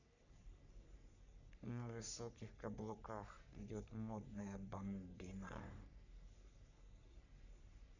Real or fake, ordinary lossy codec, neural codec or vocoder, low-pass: fake; none; codec, 44.1 kHz, 3.4 kbps, Pupu-Codec; 7.2 kHz